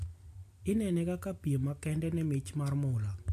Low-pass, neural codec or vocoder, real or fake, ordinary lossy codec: 14.4 kHz; none; real; AAC, 64 kbps